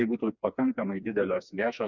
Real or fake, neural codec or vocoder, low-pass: fake; codec, 16 kHz, 2 kbps, FreqCodec, smaller model; 7.2 kHz